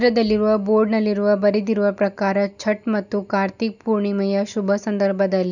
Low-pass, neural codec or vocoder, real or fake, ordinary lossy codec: 7.2 kHz; none; real; none